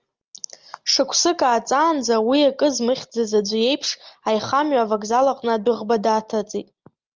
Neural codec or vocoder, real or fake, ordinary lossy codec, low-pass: none; real; Opus, 32 kbps; 7.2 kHz